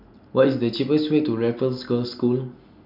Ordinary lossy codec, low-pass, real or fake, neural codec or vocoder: none; 5.4 kHz; real; none